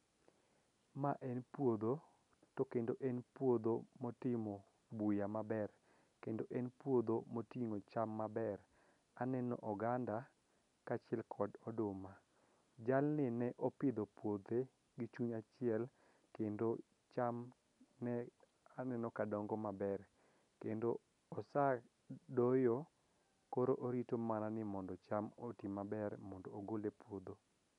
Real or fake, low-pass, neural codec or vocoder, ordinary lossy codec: real; 10.8 kHz; none; none